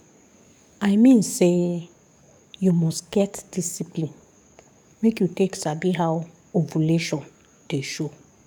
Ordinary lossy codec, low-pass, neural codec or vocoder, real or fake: none; 19.8 kHz; codec, 44.1 kHz, 7.8 kbps, DAC; fake